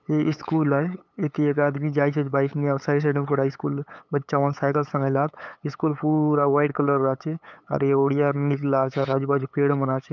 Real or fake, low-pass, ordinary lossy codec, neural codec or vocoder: fake; none; none; codec, 16 kHz, 8 kbps, FunCodec, trained on LibriTTS, 25 frames a second